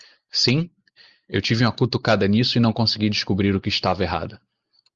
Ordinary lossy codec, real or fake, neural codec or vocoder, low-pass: Opus, 32 kbps; real; none; 7.2 kHz